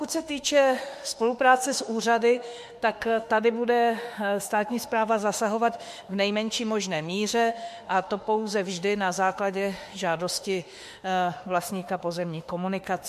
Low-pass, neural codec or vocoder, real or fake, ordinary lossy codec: 14.4 kHz; autoencoder, 48 kHz, 32 numbers a frame, DAC-VAE, trained on Japanese speech; fake; MP3, 64 kbps